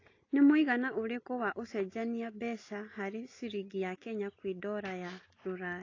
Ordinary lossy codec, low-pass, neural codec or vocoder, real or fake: AAC, 32 kbps; 7.2 kHz; none; real